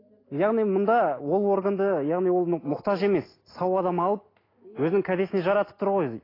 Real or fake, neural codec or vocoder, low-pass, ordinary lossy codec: real; none; 5.4 kHz; AAC, 24 kbps